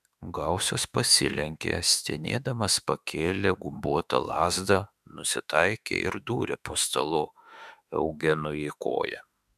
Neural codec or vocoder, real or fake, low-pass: autoencoder, 48 kHz, 32 numbers a frame, DAC-VAE, trained on Japanese speech; fake; 14.4 kHz